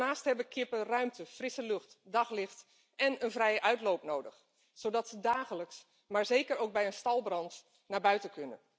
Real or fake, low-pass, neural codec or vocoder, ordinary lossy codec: real; none; none; none